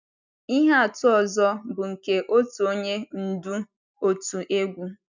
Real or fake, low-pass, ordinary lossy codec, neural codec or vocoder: real; 7.2 kHz; none; none